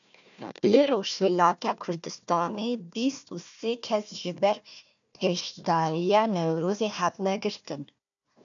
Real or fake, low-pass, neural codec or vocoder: fake; 7.2 kHz; codec, 16 kHz, 1 kbps, FunCodec, trained on Chinese and English, 50 frames a second